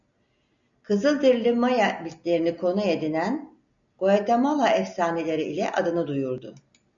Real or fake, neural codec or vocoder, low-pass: real; none; 7.2 kHz